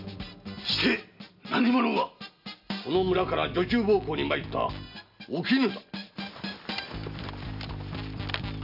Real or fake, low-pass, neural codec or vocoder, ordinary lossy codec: real; 5.4 kHz; none; none